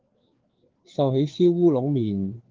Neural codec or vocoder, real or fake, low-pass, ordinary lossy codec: codec, 16 kHz, 4 kbps, FreqCodec, larger model; fake; 7.2 kHz; Opus, 16 kbps